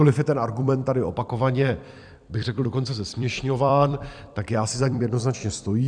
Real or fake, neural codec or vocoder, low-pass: fake; vocoder, 44.1 kHz, 128 mel bands every 256 samples, BigVGAN v2; 9.9 kHz